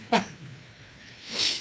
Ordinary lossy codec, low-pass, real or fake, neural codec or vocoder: none; none; fake; codec, 16 kHz, 2 kbps, FreqCodec, larger model